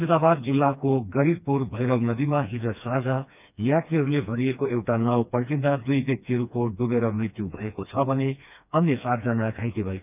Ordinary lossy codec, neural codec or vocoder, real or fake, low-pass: none; codec, 16 kHz, 2 kbps, FreqCodec, smaller model; fake; 3.6 kHz